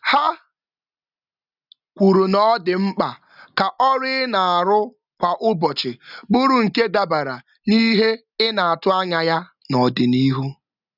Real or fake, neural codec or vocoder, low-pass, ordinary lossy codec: real; none; 5.4 kHz; none